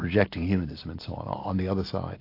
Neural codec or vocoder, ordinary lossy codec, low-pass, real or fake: none; AAC, 32 kbps; 5.4 kHz; real